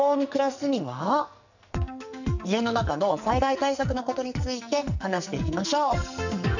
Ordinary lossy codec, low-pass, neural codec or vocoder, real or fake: none; 7.2 kHz; codec, 44.1 kHz, 2.6 kbps, SNAC; fake